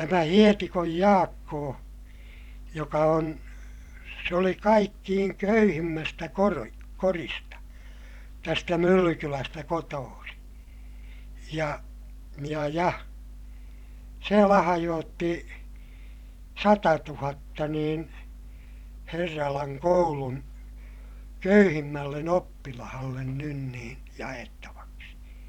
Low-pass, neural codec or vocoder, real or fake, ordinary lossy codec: 19.8 kHz; vocoder, 44.1 kHz, 128 mel bands every 512 samples, BigVGAN v2; fake; none